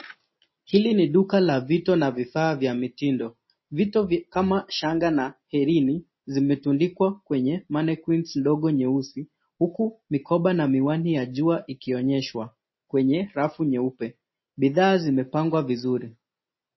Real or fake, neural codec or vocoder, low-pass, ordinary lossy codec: real; none; 7.2 kHz; MP3, 24 kbps